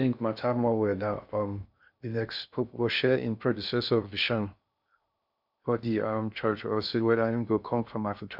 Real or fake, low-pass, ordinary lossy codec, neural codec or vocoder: fake; 5.4 kHz; none; codec, 16 kHz in and 24 kHz out, 0.6 kbps, FocalCodec, streaming, 2048 codes